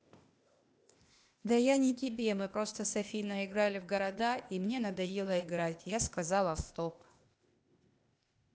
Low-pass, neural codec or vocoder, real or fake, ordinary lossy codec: none; codec, 16 kHz, 0.8 kbps, ZipCodec; fake; none